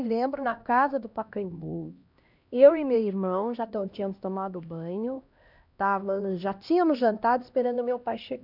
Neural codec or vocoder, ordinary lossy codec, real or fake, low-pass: codec, 16 kHz, 1 kbps, X-Codec, HuBERT features, trained on LibriSpeech; none; fake; 5.4 kHz